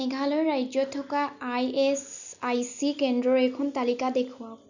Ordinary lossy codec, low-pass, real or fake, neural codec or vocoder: none; 7.2 kHz; real; none